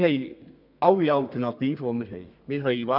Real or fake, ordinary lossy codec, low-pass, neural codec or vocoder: fake; none; 5.4 kHz; codec, 32 kHz, 1.9 kbps, SNAC